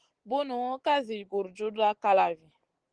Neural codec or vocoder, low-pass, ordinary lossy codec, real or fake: none; 9.9 kHz; Opus, 16 kbps; real